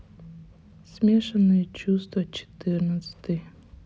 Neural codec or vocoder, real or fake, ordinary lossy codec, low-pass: none; real; none; none